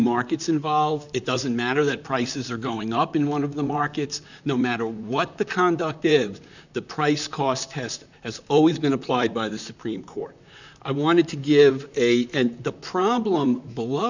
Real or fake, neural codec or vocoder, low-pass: fake; vocoder, 44.1 kHz, 128 mel bands, Pupu-Vocoder; 7.2 kHz